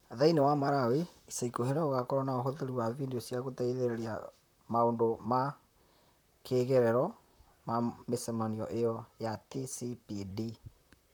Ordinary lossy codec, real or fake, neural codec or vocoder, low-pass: none; fake; vocoder, 44.1 kHz, 128 mel bands, Pupu-Vocoder; none